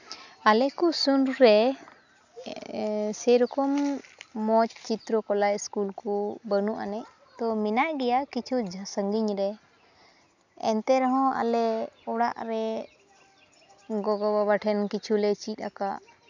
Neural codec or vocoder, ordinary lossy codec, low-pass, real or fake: none; none; 7.2 kHz; real